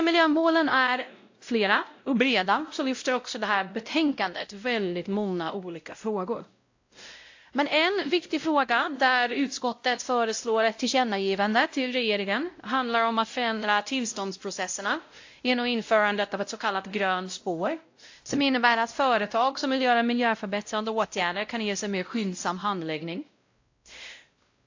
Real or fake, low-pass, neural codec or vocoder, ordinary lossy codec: fake; 7.2 kHz; codec, 16 kHz, 0.5 kbps, X-Codec, WavLM features, trained on Multilingual LibriSpeech; AAC, 48 kbps